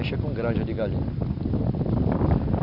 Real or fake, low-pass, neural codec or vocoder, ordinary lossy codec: fake; 5.4 kHz; vocoder, 44.1 kHz, 128 mel bands every 256 samples, BigVGAN v2; none